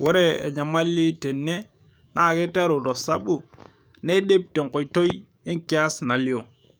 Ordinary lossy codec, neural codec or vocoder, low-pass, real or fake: none; codec, 44.1 kHz, 7.8 kbps, DAC; none; fake